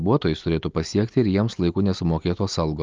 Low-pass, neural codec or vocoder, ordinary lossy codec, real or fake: 7.2 kHz; none; Opus, 32 kbps; real